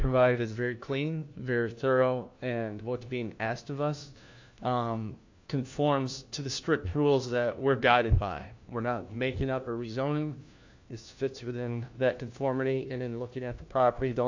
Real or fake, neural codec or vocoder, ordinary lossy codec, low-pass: fake; codec, 16 kHz, 1 kbps, FunCodec, trained on LibriTTS, 50 frames a second; AAC, 48 kbps; 7.2 kHz